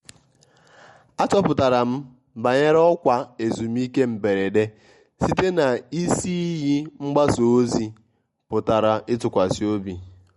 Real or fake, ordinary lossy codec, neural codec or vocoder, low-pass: real; MP3, 48 kbps; none; 19.8 kHz